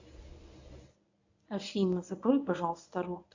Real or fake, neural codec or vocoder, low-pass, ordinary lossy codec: fake; codec, 24 kHz, 0.9 kbps, WavTokenizer, medium speech release version 1; 7.2 kHz; AAC, 48 kbps